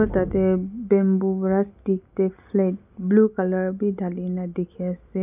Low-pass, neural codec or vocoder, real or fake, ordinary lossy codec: 3.6 kHz; none; real; none